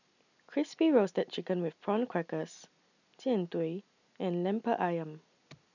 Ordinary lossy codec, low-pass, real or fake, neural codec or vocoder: none; 7.2 kHz; real; none